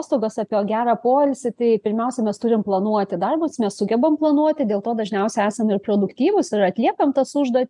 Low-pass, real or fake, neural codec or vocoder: 10.8 kHz; real; none